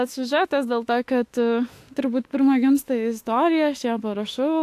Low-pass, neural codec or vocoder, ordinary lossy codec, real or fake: 14.4 kHz; autoencoder, 48 kHz, 32 numbers a frame, DAC-VAE, trained on Japanese speech; AAC, 64 kbps; fake